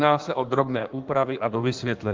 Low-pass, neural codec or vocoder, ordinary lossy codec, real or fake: 7.2 kHz; codec, 16 kHz in and 24 kHz out, 1.1 kbps, FireRedTTS-2 codec; Opus, 32 kbps; fake